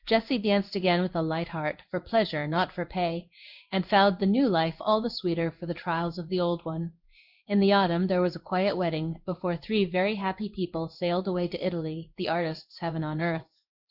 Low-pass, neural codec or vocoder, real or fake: 5.4 kHz; codec, 16 kHz in and 24 kHz out, 1 kbps, XY-Tokenizer; fake